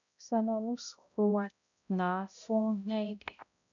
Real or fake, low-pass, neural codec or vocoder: fake; 7.2 kHz; codec, 16 kHz, 0.5 kbps, X-Codec, HuBERT features, trained on balanced general audio